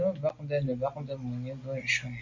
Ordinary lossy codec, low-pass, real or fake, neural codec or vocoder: MP3, 48 kbps; 7.2 kHz; real; none